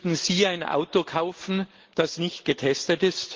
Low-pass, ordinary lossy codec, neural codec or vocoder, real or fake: 7.2 kHz; Opus, 16 kbps; none; real